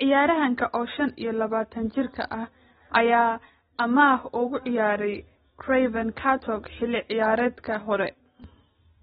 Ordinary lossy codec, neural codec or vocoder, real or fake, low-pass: AAC, 16 kbps; none; real; 9.9 kHz